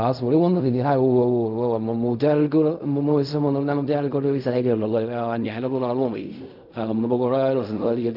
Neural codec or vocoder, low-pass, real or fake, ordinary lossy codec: codec, 16 kHz in and 24 kHz out, 0.4 kbps, LongCat-Audio-Codec, fine tuned four codebook decoder; 5.4 kHz; fake; none